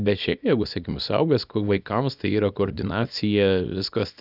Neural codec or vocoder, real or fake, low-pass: codec, 24 kHz, 0.9 kbps, WavTokenizer, small release; fake; 5.4 kHz